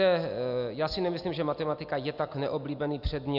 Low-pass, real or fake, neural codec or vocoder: 5.4 kHz; real; none